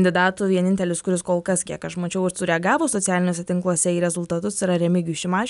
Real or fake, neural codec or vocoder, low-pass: real; none; 10.8 kHz